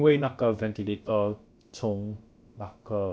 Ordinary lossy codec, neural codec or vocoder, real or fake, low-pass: none; codec, 16 kHz, about 1 kbps, DyCAST, with the encoder's durations; fake; none